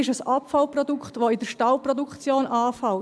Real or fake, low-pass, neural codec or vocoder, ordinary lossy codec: real; none; none; none